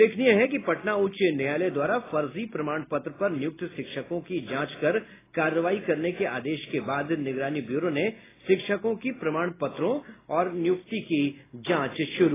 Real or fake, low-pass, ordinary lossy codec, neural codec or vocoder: real; 3.6 kHz; AAC, 16 kbps; none